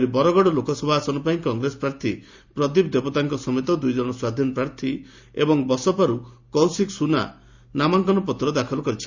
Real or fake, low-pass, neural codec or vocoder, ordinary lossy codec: real; 7.2 kHz; none; Opus, 64 kbps